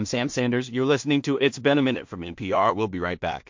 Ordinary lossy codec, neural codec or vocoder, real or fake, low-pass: MP3, 48 kbps; codec, 16 kHz in and 24 kHz out, 0.4 kbps, LongCat-Audio-Codec, two codebook decoder; fake; 7.2 kHz